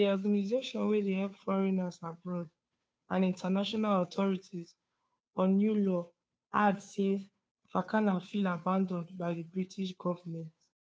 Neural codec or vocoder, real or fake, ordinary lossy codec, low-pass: codec, 16 kHz, 2 kbps, FunCodec, trained on Chinese and English, 25 frames a second; fake; none; none